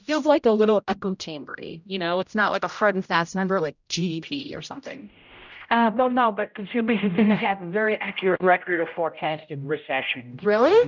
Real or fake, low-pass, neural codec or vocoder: fake; 7.2 kHz; codec, 16 kHz, 0.5 kbps, X-Codec, HuBERT features, trained on general audio